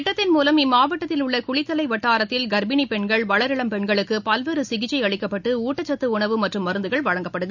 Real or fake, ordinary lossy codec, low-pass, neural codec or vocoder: real; none; 7.2 kHz; none